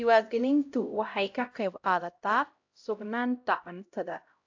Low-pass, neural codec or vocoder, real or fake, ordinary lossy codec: 7.2 kHz; codec, 16 kHz, 0.5 kbps, X-Codec, HuBERT features, trained on LibriSpeech; fake; AAC, 48 kbps